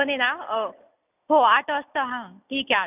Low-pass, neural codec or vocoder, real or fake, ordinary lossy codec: 3.6 kHz; none; real; none